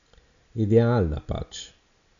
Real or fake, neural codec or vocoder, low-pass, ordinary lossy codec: real; none; 7.2 kHz; none